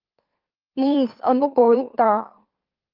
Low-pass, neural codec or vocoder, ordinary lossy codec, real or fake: 5.4 kHz; autoencoder, 44.1 kHz, a latent of 192 numbers a frame, MeloTTS; Opus, 32 kbps; fake